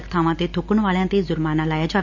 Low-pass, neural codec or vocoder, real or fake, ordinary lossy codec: 7.2 kHz; none; real; none